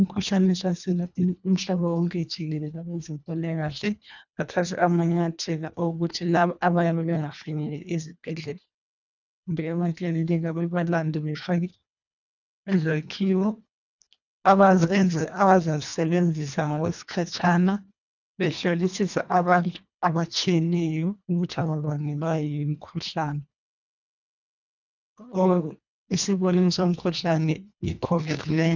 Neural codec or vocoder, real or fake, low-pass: codec, 24 kHz, 1.5 kbps, HILCodec; fake; 7.2 kHz